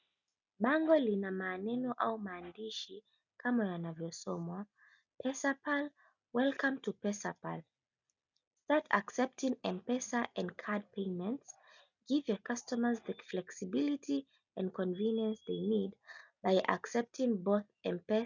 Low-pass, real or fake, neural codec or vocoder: 7.2 kHz; real; none